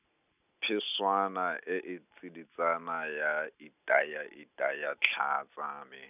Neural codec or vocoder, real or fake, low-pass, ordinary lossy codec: none; real; 3.6 kHz; none